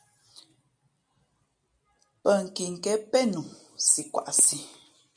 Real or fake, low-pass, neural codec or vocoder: real; 9.9 kHz; none